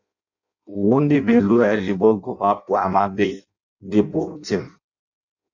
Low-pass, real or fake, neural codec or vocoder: 7.2 kHz; fake; codec, 16 kHz in and 24 kHz out, 0.6 kbps, FireRedTTS-2 codec